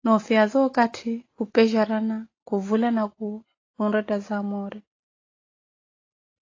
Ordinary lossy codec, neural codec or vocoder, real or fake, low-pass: AAC, 32 kbps; none; real; 7.2 kHz